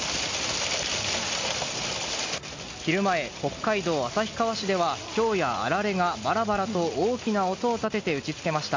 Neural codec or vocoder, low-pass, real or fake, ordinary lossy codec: none; 7.2 kHz; real; MP3, 48 kbps